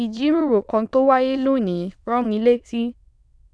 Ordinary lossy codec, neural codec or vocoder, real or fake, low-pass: none; autoencoder, 22.05 kHz, a latent of 192 numbers a frame, VITS, trained on many speakers; fake; none